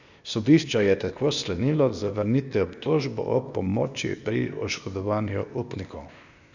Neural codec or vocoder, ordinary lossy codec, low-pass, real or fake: codec, 16 kHz, 0.8 kbps, ZipCodec; none; 7.2 kHz; fake